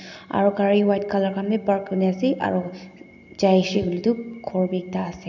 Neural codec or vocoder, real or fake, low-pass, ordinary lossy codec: none; real; 7.2 kHz; none